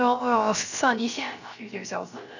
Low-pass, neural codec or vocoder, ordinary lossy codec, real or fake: 7.2 kHz; codec, 16 kHz, 0.3 kbps, FocalCodec; none; fake